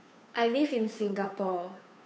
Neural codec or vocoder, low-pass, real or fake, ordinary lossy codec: codec, 16 kHz, 2 kbps, FunCodec, trained on Chinese and English, 25 frames a second; none; fake; none